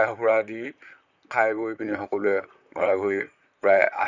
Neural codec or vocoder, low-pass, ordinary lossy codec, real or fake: vocoder, 44.1 kHz, 128 mel bands, Pupu-Vocoder; 7.2 kHz; none; fake